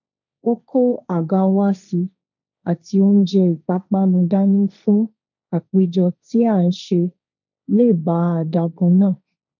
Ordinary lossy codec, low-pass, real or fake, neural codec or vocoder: none; 7.2 kHz; fake; codec, 16 kHz, 1.1 kbps, Voila-Tokenizer